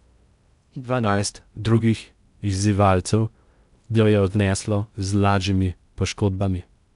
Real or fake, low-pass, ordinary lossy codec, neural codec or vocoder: fake; 10.8 kHz; none; codec, 16 kHz in and 24 kHz out, 0.6 kbps, FocalCodec, streaming, 2048 codes